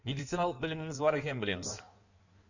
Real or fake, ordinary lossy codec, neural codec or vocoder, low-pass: fake; none; codec, 16 kHz in and 24 kHz out, 1.1 kbps, FireRedTTS-2 codec; 7.2 kHz